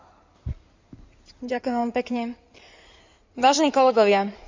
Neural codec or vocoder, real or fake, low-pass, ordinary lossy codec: codec, 16 kHz in and 24 kHz out, 2.2 kbps, FireRedTTS-2 codec; fake; 7.2 kHz; MP3, 64 kbps